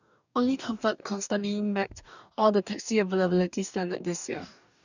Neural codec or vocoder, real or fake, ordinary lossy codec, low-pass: codec, 44.1 kHz, 2.6 kbps, DAC; fake; none; 7.2 kHz